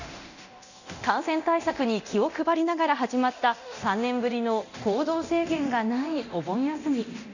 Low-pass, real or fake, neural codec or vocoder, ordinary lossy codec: 7.2 kHz; fake; codec, 24 kHz, 0.9 kbps, DualCodec; none